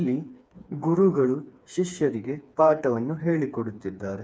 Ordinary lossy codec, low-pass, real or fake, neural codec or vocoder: none; none; fake; codec, 16 kHz, 4 kbps, FreqCodec, smaller model